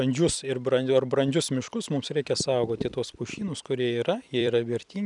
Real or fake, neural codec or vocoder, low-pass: fake; vocoder, 44.1 kHz, 128 mel bands every 256 samples, BigVGAN v2; 10.8 kHz